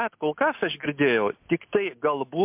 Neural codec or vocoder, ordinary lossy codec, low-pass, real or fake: none; MP3, 32 kbps; 3.6 kHz; real